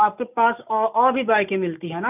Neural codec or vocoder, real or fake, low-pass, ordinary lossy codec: none; real; 3.6 kHz; none